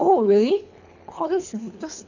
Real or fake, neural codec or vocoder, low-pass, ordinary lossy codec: fake; codec, 24 kHz, 3 kbps, HILCodec; 7.2 kHz; none